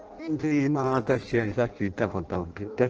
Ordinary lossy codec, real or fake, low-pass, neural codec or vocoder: Opus, 16 kbps; fake; 7.2 kHz; codec, 16 kHz in and 24 kHz out, 0.6 kbps, FireRedTTS-2 codec